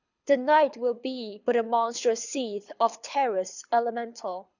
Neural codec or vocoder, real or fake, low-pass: codec, 24 kHz, 6 kbps, HILCodec; fake; 7.2 kHz